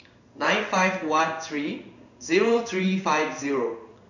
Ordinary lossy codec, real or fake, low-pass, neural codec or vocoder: none; fake; 7.2 kHz; codec, 16 kHz in and 24 kHz out, 1 kbps, XY-Tokenizer